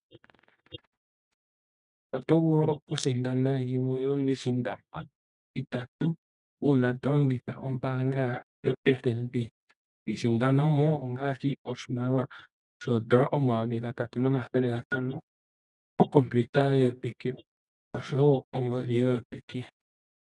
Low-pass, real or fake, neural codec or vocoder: 10.8 kHz; fake; codec, 24 kHz, 0.9 kbps, WavTokenizer, medium music audio release